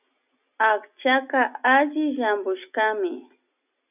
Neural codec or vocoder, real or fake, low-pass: none; real; 3.6 kHz